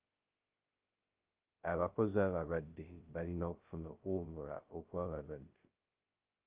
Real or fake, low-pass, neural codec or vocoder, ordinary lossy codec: fake; 3.6 kHz; codec, 16 kHz, 0.2 kbps, FocalCodec; Opus, 32 kbps